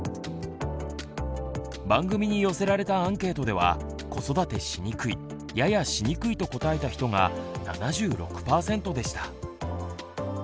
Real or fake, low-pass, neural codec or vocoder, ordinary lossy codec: real; none; none; none